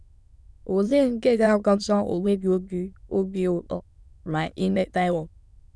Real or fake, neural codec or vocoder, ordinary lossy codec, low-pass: fake; autoencoder, 22.05 kHz, a latent of 192 numbers a frame, VITS, trained on many speakers; none; none